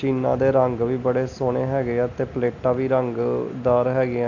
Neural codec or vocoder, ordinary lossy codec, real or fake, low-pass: none; none; real; 7.2 kHz